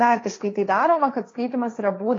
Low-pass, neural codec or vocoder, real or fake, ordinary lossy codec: 7.2 kHz; codec, 16 kHz, 1.1 kbps, Voila-Tokenizer; fake; AAC, 32 kbps